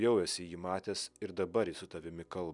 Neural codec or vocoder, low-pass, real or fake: none; 10.8 kHz; real